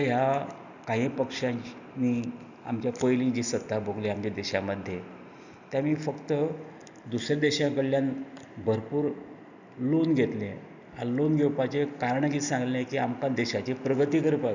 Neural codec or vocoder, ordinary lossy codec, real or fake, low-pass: none; none; real; 7.2 kHz